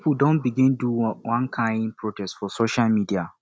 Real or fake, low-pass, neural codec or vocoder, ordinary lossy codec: real; none; none; none